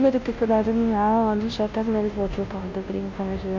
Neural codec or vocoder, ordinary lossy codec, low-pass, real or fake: codec, 16 kHz, 0.5 kbps, FunCodec, trained on Chinese and English, 25 frames a second; MP3, 48 kbps; 7.2 kHz; fake